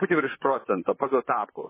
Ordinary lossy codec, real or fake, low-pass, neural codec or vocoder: MP3, 16 kbps; fake; 3.6 kHz; vocoder, 44.1 kHz, 128 mel bands, Pupu-Vocoder